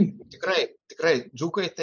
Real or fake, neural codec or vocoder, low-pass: real; none; 7.2 kHz